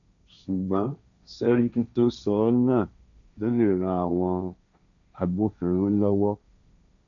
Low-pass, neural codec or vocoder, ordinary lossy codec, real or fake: 7.2 kHz; codec, 16 kHz, 1.1 kbps, Voila-Tokenizer; none; fake